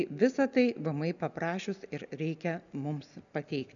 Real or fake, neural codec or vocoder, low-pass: real; none; 7.2 kHz